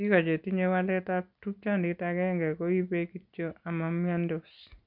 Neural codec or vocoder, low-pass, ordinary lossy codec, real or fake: none; 5.4 kHz; none; real